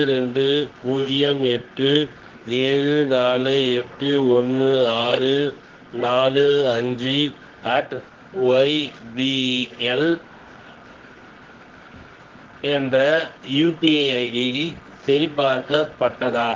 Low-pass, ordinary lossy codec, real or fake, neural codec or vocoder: 7.2 kHz; Opus, 16 kbps; fake; codec, 24 kHz, 0.9 kbps, WavTokenizer, medium music audio release